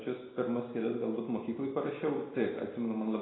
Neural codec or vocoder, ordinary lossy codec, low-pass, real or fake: none; AAC, 16 kbps; 7.2 kHz; real